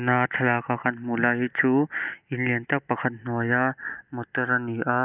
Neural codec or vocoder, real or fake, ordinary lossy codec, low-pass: none; real; none; 3.6 kHz